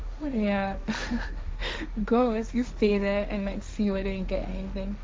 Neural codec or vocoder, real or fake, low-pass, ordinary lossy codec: codec, 16 kHz, 1.1 kbps, Voila-Tokenizer; fake; none; none